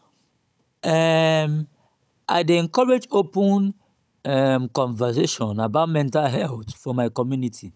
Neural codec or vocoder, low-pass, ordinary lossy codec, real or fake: codec, 16 kHz, 16 kbps, FunCodec, trained on Chinese and English, 50 frames a second; none; none; fake